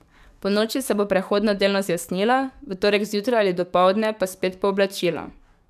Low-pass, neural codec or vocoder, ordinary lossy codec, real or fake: 14.4 kHz; codec, 44.1 kHz, 7.8 kbps, DAC; none; fake